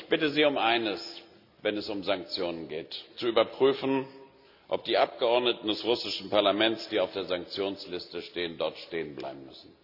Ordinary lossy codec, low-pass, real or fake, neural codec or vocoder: none; 5.4 kHz; real; none